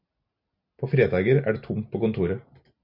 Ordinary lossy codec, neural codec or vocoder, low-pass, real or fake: MP3, 48 kbps; none; 5.4 kHz; real